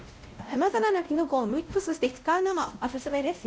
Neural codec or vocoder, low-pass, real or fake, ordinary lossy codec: codec, 16 kHz, 0.5 kbps, X-Codec, WavLM features, trained on Multilingual LibriSpeech; none; fake; none